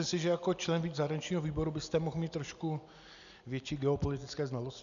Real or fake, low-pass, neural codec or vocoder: real; 7.2 kHz; none